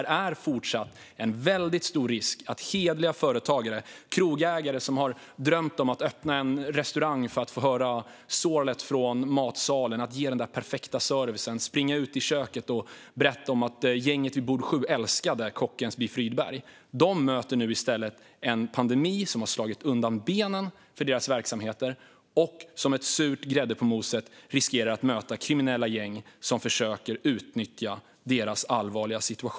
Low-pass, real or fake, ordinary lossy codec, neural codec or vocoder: none; real; none; none